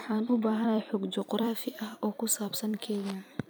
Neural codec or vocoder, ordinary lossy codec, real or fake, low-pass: vocoder, 44.1 kHz, 128 mel bands every 256 samples, BigVGAN v2; none; fake; none